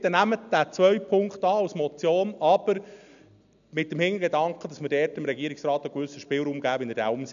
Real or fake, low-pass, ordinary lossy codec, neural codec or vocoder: real; 7.2 kHz; none; none